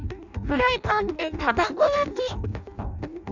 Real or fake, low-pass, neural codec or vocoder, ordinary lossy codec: fake; 7.2 kHz; codec, 16 kHz in and 24 kHz out, 0.6 kbps, FireRedTTS-2 codec; none